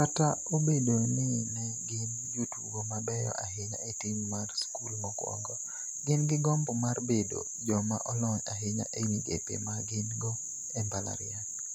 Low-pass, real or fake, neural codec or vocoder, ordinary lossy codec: 19.8 kHz; real; none; none